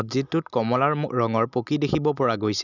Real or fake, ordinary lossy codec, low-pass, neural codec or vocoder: real; none; 7.2 kHz; none